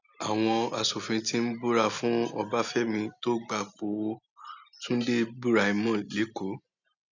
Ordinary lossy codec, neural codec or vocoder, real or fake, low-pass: none; none; real; 7.2 kHz